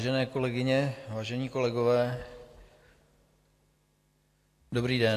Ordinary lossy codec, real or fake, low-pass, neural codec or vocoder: AAC, 64 kbps; real; 14.4 kHz; none